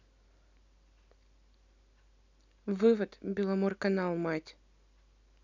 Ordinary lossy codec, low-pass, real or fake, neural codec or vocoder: none; 7.2 kHz; real; none